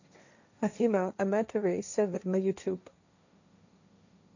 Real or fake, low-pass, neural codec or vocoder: fake; 7.2 kHz; codec, 16 kHz, 1.1 kbps, Voila-Tokenizer